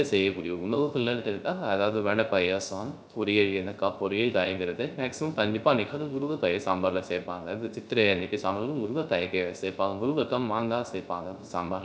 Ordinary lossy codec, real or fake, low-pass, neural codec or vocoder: none; fake; none; codec, 16 kHz, 0.3 kbps, FocalCodec